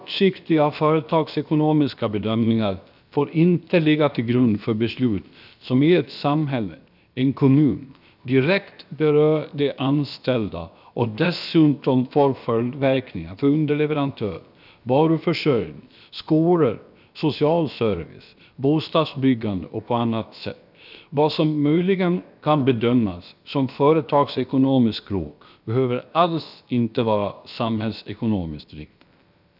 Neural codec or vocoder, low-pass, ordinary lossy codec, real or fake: codec, 16 kHz, about 1 kbps, DyCAST, with the encoder's durations; 5.4 kHz; none; fake